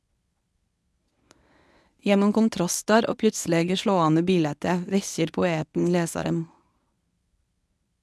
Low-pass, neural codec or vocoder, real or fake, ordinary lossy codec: none; codec, 24 kHz, 0.9 kbps, WavTokenizer, medium speech release version 1; fake; none